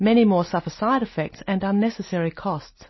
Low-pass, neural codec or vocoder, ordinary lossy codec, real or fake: 7.2 kHz; none; MP3, 24 kbps; real